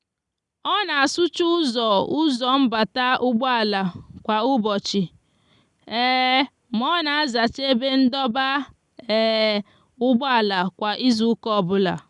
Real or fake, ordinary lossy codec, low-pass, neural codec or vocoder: real; none; 10.8 kHz; none